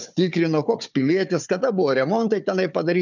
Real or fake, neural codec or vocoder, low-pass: fake; codec, 16 kHz, 4 kbps, FunCodec, trained on Chinese and English, 50 frames a second; 7.2 kHz